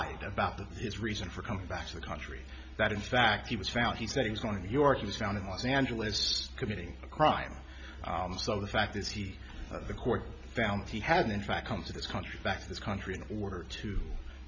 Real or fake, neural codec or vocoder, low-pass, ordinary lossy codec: real; none; 7.2 kHz; MP3, 48 kbps